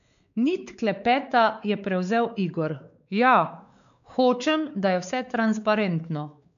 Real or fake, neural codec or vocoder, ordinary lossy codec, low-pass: fake; codec, 16 kHz, 4 kbps, X-Codec, WavLM features, trained on Multilingual LibriSpeech; none; 7.2 kHz